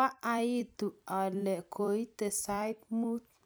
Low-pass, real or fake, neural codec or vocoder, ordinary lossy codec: none; fake; vocoder, 44.1 kHz, 128 mel bands every 256 samples, BigVGAN v2; none